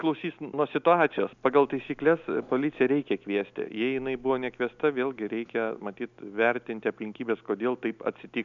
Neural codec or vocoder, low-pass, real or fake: none; 7.2 kHz; real